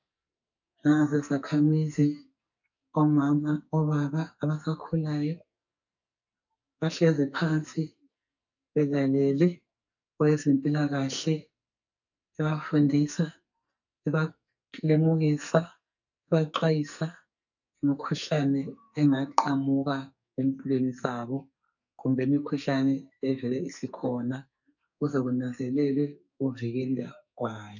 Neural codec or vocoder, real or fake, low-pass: codec, 44.1 kHz, 2.6 kbps, SNAC; fake; 7.2 kHz